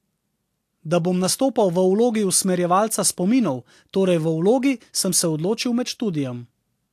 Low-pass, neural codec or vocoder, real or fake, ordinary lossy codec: 14.4 kHz; none; real; AAC, 64 kbps